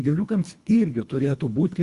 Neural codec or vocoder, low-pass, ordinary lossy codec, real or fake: codec, 24 kHz, 1.5 kbps, HILCodec; 10.8 kHz; Opus, 64 kbps; fake